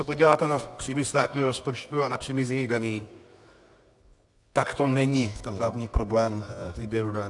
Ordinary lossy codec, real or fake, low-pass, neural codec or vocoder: AAC, 64 kbps; fake; 10.8 kHz; codec, 24 kHz, 0.9 kbps, WavTokenizer, medium music audio release